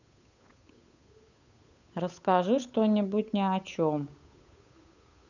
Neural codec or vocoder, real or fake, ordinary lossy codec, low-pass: codec, 16 kHz, 8 kbps, FunCodec, trained on Chinese and English, 25 frames a second; fake; none; 7.2 kHz